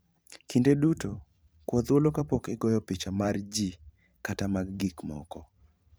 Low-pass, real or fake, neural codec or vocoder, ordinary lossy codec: none; fake; vocoder, 44.1 kHz, 128 mel bands every 512 samples, BigVGAN v2; none